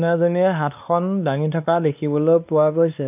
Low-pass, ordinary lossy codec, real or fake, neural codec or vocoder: 3.6 kHz; none; fake; codec, 16 kHz, 2 kbps, X-Codec, WavLM features, trained on Multilingual LibriSpeech